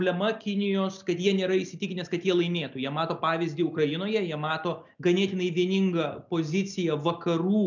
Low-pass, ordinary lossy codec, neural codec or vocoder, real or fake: 7.2 kHz; MP3, 64 kbps; none; real